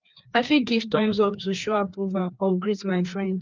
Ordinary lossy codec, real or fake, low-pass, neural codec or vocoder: Opus, 24 kbps; fake; 7.2 kHz; codec, 16 kHz, 2 kbps, FreqCodec, larger model